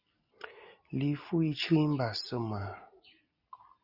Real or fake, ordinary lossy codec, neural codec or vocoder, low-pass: real; Opus, 64 kbps; none; 5.4 kHz